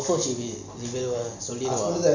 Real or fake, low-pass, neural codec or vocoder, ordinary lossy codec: real; 7.2 kHz; none; none